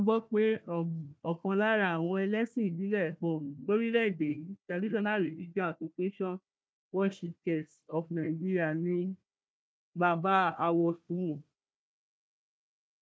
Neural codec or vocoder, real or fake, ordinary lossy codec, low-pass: codec, 16 kHz, 1 kbps, FunCodec, trained on Chinese and English, 50 frames a second; fake; none; none